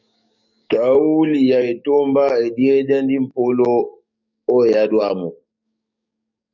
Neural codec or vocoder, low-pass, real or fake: codec, 44.1 kHz, 7.8 kbps, DAC; 7.2 kHz; fake